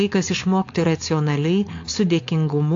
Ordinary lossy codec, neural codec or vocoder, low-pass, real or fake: AAC, 48 kbps; codec, 16 kHz, 4.8 kbps, FACodec; 7.2 kHz; fake